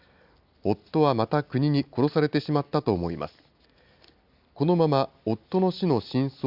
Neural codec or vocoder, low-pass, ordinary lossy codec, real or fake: none; 5.4 kHz; Opus, 64 kbps; real